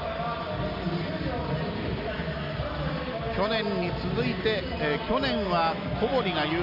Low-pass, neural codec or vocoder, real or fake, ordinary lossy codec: 5.4 kHz; none; real; none